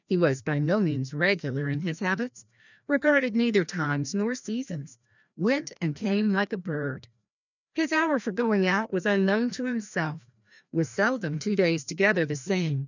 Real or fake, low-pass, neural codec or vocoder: fake; 7.2 kHz; codec, 16 kHz, 1 kbps, FreqCodec, larger model